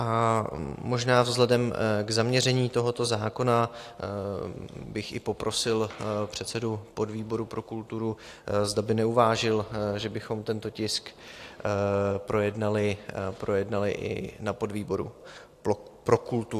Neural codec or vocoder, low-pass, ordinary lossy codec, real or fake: none; 14.4 kHz; AAC, 64 kbps; real